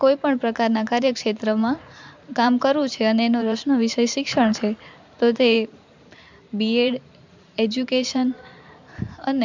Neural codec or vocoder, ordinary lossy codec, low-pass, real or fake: vocoder, 44.1 kHz, 128 mel bands every 512 samples, BigVGAN v2; MP3, 64 kbps; 7.2 kHz; fake